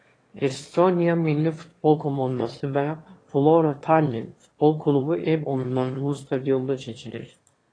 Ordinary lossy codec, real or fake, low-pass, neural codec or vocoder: AAC, 32 kbps; fake; 9.9 kHz; autoencoder, 22.05 kHz, a latent of 192 numbers a frame, VITS, trained on one speaker